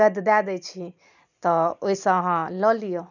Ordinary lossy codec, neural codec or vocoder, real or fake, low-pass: none; none; real; 7.2 kHz